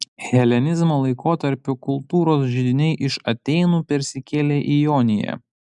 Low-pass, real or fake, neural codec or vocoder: 10.8 kHz; real; none